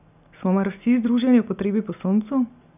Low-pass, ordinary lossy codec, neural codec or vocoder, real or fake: 3.6 kHz; none; none; real